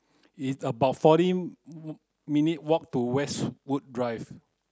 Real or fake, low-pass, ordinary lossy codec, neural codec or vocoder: real; none; none; none